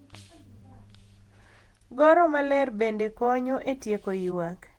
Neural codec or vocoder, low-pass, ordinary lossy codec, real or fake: vocoder, 48 kHz, 128 mel bands, Vocos; 19.8 kHz; Opus, 24 kbps; fake